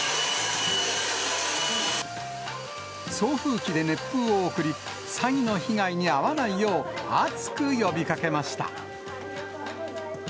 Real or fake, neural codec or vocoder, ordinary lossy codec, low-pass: real; none; none; none